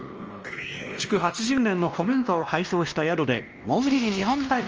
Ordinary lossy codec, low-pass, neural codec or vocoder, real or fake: Opus, 24 kbps; 7.2 kHz; codec, 16 kHz, 1 kbps, X-Codec, WavLM features, trained on Multilingual LibriSpeech; fake